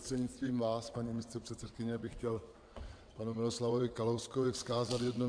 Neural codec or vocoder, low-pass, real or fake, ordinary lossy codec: vocoder, 22.05 kHz, 80 mel bands, WaveNeXt; 9.9 kHz; fake; MP3, 64 kbps